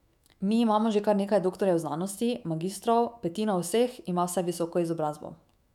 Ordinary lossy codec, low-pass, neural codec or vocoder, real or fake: none; 19.8 kHz; autoencoder, 48 kHz, 128 numbers a frame, DAC-VAE, trained on Japanese speech; fake